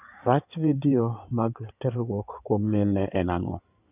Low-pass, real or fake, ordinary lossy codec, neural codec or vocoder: 3.6 kHz; fake; none; codec, 16 kHz in and 24 kHz out, 2.2 kbps, FireRedTTS-2 codec